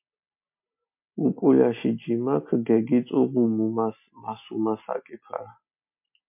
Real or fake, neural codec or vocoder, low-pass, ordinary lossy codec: real; none; 3.6 kHz; MP3, 32 kbps